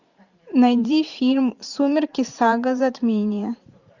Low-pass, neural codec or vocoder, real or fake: 7.2 kHz; vocoder, 44.1 kHz, 128 mel bands every 512 samples, BigVGAN v2; fake